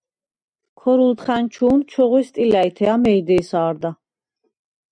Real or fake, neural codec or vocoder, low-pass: real; none; 9.9 kHz